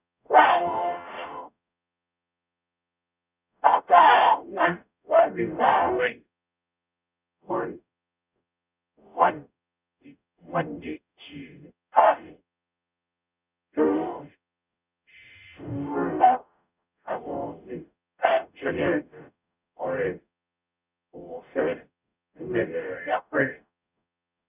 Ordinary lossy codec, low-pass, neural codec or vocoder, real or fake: none; 3.6 kHz; codec, 44.1 kHz, 0.9 kbps, DAC; fake